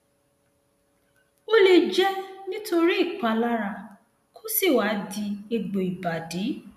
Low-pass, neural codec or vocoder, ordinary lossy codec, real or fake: 14.4 kHz; none; none; real